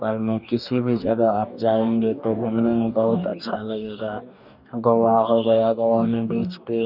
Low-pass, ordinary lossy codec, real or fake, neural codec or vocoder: 5.4 kHz; none; fake; codec, 44.1 kHz, 2.6 kbps, DAC